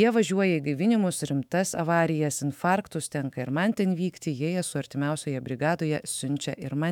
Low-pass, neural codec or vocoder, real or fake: 19.8 kHz; autoencoder, 48 kHz, 128 numbers a frame, DAC-VAE, trained on Japanese speech; fake